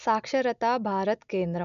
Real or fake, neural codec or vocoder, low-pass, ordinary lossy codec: real; none; 7.2 kHz; none